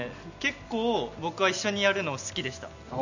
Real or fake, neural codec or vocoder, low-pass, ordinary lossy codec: real; none; 7.2 kHz; none